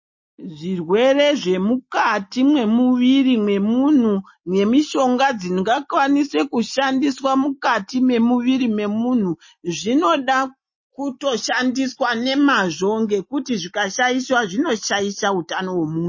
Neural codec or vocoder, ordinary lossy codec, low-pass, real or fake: none; MP3, 32 kbps; 7.2 kHz; real